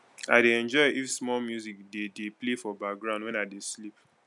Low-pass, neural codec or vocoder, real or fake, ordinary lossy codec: 10.8 kHz; none; real; MP3, 96 kbps